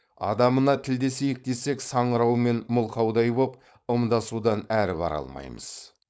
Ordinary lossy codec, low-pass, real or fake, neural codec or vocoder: none; none; fake; codec, 16 kHz, 4.8 kbps, FACodec